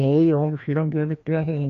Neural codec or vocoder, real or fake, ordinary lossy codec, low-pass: codec, 16 kHz, 1 kbps, FreqCodec, larger model; fake; AAC, 64 kbps; 7.2 kHz